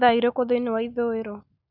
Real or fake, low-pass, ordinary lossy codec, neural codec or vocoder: real; 5.4 kHz; none; none